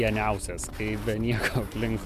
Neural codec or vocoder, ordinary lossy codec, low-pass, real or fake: vocoder, 44.1 kHz, 128 mel bands every 256 samples, BigVGAN v2; MP3, 96 kbps; 14.4 kHz; fake